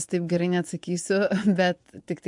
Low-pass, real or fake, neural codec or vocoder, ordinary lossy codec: 10.8 kHz; fake; vocoder, 24 kHz, 100 mel bands, Vocos; MP3, 64 kbps